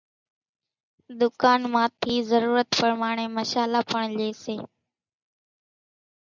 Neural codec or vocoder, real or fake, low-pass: none; real; 7.2 kHz